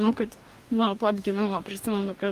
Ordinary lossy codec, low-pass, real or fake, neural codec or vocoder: Opus, 32 kbps; 14.4 kHz; fake; codec, 44.1 kHz, 2.6 kbps, DAC